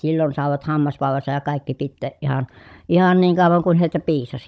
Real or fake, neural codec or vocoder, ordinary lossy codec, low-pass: fake; codec, 16 kHz, 16 kbps, FunCodec, trained on Chinese and English, 50 frames a second; none; none